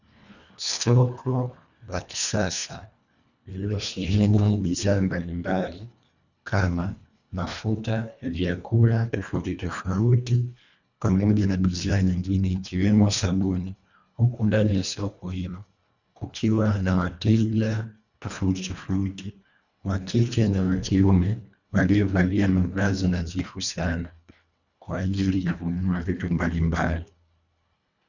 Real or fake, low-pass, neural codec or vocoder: fake; 7.2 kHz; codec, 24 kHz, 1.5 kbps, HILCodec